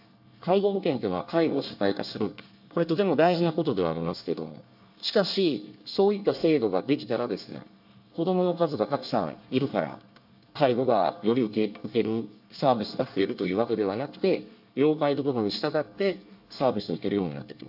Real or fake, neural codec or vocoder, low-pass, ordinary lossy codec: fake; codec, 24 kHz, 1 kbps, SNAC; 5.4 kHz; AAC, 48 kbps